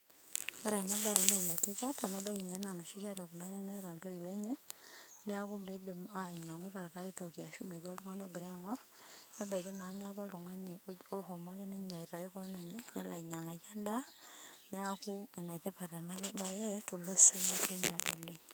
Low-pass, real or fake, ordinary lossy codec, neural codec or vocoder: none; fake; none; codec, 44.1 kHz, 2.6 kbps, SNAC